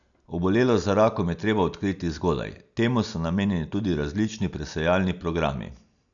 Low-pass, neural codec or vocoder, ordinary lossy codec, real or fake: 7.2 kHz; none; none; real